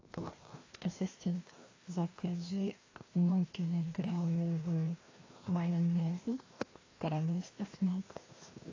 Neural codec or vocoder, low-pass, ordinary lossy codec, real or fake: codec, 16 kHz, 1 kbps, FreqCodec, larger model; 7.2 kHz; AAC, 32 kbps; fake